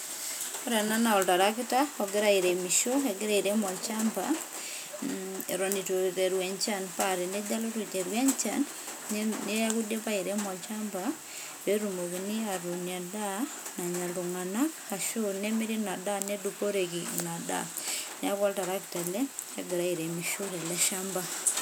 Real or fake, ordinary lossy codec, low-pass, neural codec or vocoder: real; none; none; none